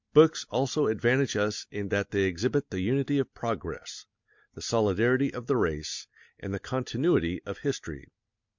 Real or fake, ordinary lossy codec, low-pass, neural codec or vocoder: real; MP3, 64 kbps; 7.2 kHz; none